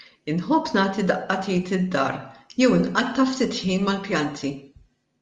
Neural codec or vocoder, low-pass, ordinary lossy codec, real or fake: none; 10.8 kHz; Opus, 32 kbps; real